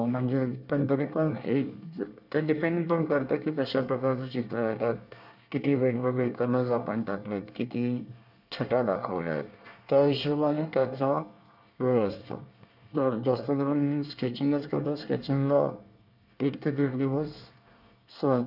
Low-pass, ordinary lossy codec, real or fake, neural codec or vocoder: 5.4 kHz; none; fake; codec, 24 kHz, 1 kbps, SNAC